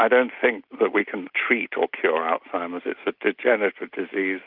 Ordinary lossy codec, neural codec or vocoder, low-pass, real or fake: Opus, 24 kbps; none; 5.4 kHz; real